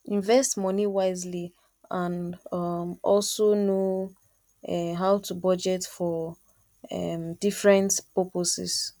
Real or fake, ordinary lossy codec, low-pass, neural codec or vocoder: real; none; 19.8 kHz; none